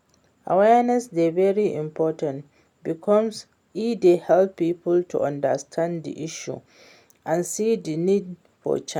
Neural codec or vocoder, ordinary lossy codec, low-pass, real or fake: none; none; 19.8 kHz; real